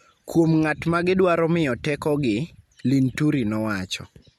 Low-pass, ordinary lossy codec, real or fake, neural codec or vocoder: 19.8 kHz; MP3, 64 kbps; real; none